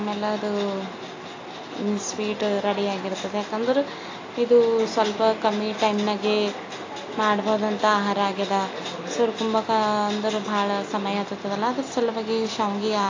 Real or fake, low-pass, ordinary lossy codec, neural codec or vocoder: real; 7.2 kHz; AAC, 32 kbps; none